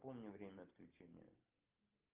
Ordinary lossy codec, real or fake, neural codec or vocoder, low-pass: Opus, 32 kbps; real; none; 3.6 kHz